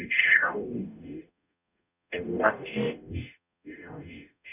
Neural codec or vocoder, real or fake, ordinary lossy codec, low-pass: codec, 44.1 kHz, 0.9 kbps, DAC; fake; none; 3.6 kHz